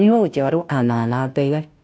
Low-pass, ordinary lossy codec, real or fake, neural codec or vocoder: none; none; fake; codec, 16 kHz, 0.5 kbps, FunCodec, trained on Chinese and English, 25 frames a second